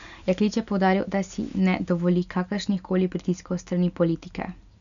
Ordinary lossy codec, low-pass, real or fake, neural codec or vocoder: none; 7.2 kHz; real; none